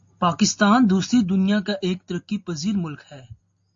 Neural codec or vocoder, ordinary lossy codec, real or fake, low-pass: none; MP3, 48 kbps; real; 7.2 kHz